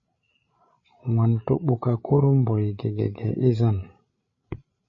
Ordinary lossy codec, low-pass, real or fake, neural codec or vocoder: MP3, 32 kbps; 7.2 kHz; fake; codec, 16 kHz, 16 kbps, FreqCodec, larger model